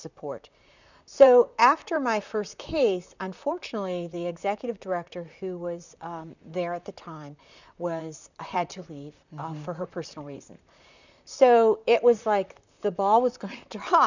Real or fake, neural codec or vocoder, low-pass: fake; vocoder, 44.1 kHz, 128 mel bands, Pupu-Vocoder; 7.2 kHz